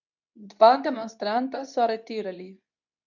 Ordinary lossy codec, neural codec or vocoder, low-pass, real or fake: none; codec, 24 kHz, 0.9 kbps, WavTokenizer, medium speech release version 2; 7.2 kHz; fake